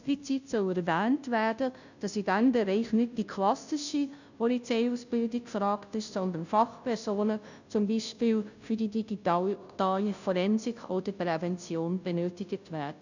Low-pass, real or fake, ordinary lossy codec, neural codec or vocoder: 7.2 kHz; fake; none; codec, 16 kHz, 0.5 kbps, FunCodec, trained on Chinese and English, 25 frames a second